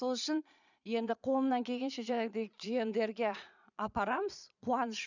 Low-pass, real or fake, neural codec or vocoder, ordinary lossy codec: 7.2 kHz; fake; vocoder, 22.05 kHz, 80 mel bands, Vocos; none